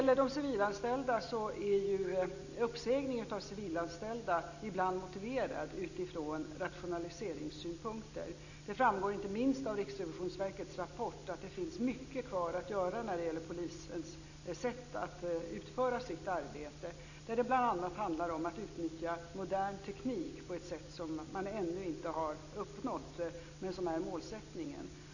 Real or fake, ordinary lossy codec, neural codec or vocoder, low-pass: real; none; none; 7.2 kHz